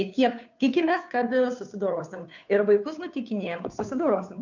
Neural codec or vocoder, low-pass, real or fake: codec, 16 kHz, 2 kbps, FunCodec, trained on Chinese and English, 25 frames a second; 7.2 kHz; fake